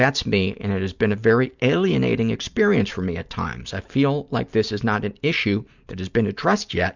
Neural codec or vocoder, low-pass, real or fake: none; 7.2 kHz; real